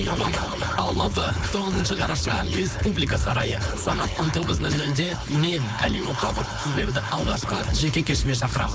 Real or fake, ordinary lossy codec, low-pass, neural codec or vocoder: fake; none; none; codec, 16 kHz, 4.8 kbps, FACodec